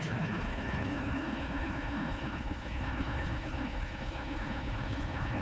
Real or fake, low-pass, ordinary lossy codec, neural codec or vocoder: fake; none; none; codec, 16 kHz, 1 kbps, FunCodec, trained on Chinese and English, 50 frames a second